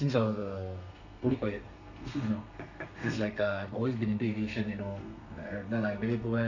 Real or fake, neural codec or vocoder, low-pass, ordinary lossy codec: fake; codec, 32 kHz, 1.9 kbps, SNAC; 7.2 kHz; none